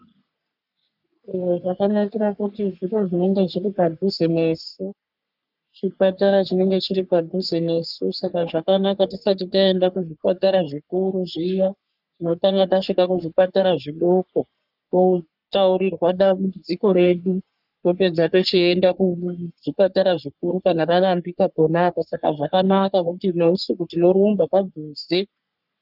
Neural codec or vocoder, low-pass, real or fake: codec, 44.1 kHz, 3.4 kbps, Pupu-Codec; 5.4 kHz; fake